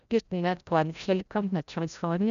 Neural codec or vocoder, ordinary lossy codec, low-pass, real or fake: codec, 16 kHz, 0.5 kbps, FreqCodec, larger model; none; 7.2 kHz; fake